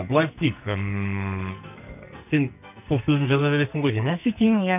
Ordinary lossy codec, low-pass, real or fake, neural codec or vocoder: none; 3.6 kHz; fake; codec, 44.1 kHz, 2.6 kbps, SNAC